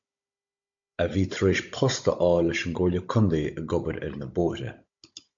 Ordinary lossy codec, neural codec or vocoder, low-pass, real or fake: MP3, 48 kbps; codec, 16 kHz, 16 kbps, FunCodec, trained on Chinese and English, 50 frames a second; 7.2 kHz; fake